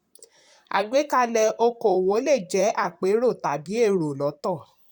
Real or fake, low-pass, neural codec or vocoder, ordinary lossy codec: fake; 19.8 kHz; vocoder, 44.1 kHz, 128 mel bands, Pupu-Vocoder; none